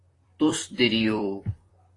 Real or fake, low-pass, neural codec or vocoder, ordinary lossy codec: fake; 10.8 kHz; vocoder, 44.1 kHz, 128 mel bands, Pupu-Vocoder; AAC, 32 kbps